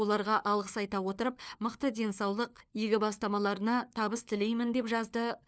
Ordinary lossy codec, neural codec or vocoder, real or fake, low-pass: none; codec, 16 kHz, 4 kbps, FunCodec, trained on LibriTTS, 50 frames a second; fake; none